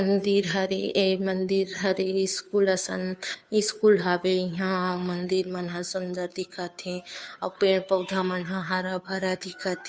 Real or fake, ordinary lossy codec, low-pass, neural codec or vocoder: fake; none; none; codec, 16 kHz, 2 kbps, FunCodec, trained on Chinese and English, 25 frames a second